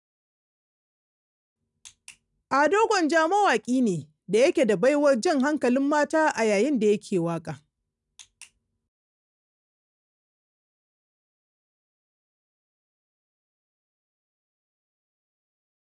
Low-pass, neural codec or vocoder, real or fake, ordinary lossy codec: 10.8 kHz; none; real; none